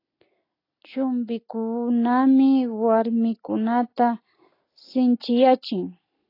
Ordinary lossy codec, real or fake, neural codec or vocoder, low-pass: AAC, 24 kbps; real; none; 5.4 kHz